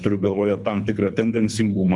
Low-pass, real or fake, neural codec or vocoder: 10.8 kHz; fake; codec, 24 kHz, 3 kbps, HILCodec